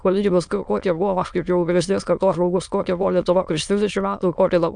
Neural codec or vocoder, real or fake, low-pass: autoencoder, 22.05 kHz, a latent of 192 numbers a frame, VITS, trained on many speakers; fake; 9.9 kHz